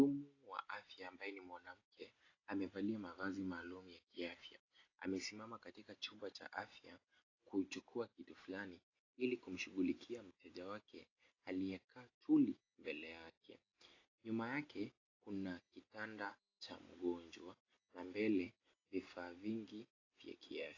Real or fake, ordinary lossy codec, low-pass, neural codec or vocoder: real; AAC, 32 kbps; 7.2 kHz; none